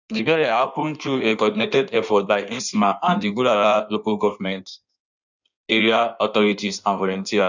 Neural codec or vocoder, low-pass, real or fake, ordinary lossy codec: codec, 16 kHz in and 24 kHz out, 1.1 kbps, FireRedTTS-2 codec; 7.2 kHz; fake; none